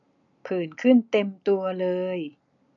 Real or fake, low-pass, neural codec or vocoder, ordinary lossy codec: real; 7.2 kHz; none; none